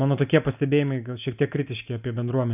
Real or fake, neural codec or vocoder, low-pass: real; none; 3.6 kHz